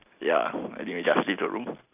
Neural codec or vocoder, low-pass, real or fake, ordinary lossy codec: none; 3.6 kHz; real; none